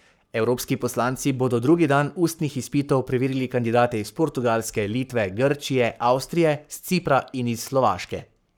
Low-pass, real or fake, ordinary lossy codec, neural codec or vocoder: none; fake; none; codec, 44.1 kHz, 7.8 kbps, Pupu-Codec